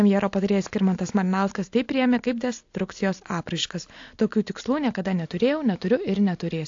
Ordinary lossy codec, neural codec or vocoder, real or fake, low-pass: AAC, 48 kbps; none; real; 7.2 kHz